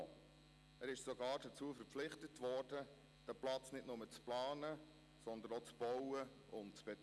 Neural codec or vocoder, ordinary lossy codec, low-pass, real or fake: none; none; none; real